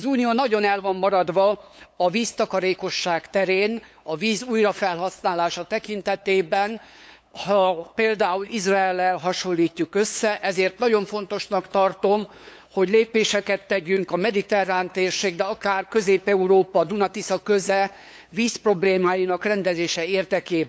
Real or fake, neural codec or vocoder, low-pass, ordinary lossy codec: fake; codec, 16 kHz, 8 kbps, FunCodec, trained on LibriTTS, 25 frames a second; none; none